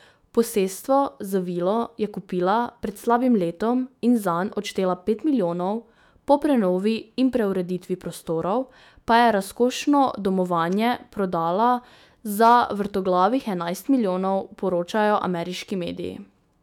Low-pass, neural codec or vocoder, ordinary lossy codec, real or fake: 19.8 kHz; autoencoder, 48 kHz, 128 numbers a frame, DAC-VAE, trained on Japanese speech; none; fake